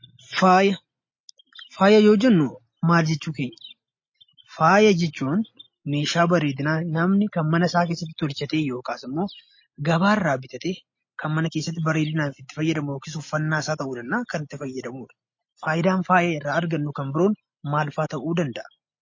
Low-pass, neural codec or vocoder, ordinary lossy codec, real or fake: 7.2 kHz; none; MP3, 32 kbps; real